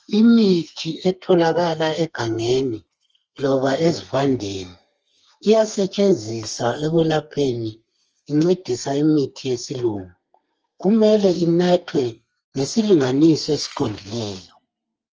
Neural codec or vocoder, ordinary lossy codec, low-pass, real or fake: codec, 32 kHz, 1.9 kbps, SNAC; Opus, 24 kbps; 7.2 kHz; fake